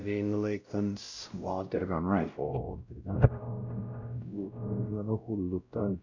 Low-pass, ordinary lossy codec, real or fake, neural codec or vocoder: 7.2 kHz; none; fake; codec, 16 kHz, 0.5 kbps, X-Codec, WavLM features, trained on Multilingual LibriSpeech